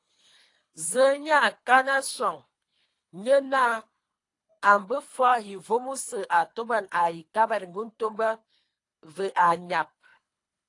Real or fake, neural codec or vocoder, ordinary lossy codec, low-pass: fake; codec, 24 kHz, 3 kbps, HILCodec; AAC, 48 kbps; 10.8 kHz